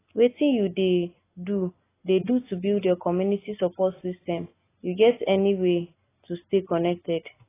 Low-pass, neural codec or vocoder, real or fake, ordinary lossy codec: 3.6 kHz; none; real; AAC, 16 kbps